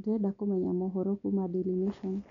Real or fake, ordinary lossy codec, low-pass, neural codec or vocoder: real; none; 7.2 kHz; none